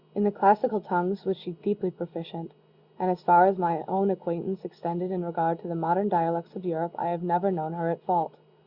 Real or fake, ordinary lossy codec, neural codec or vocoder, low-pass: real; Opus, 64 kbps; none; 5.4 kHz